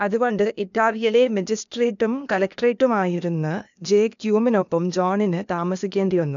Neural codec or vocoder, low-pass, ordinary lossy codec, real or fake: codec, 16 kHz, 0.8 kbps, ZipCodec; 7.2 kHz; MP3, 96 kbps; fake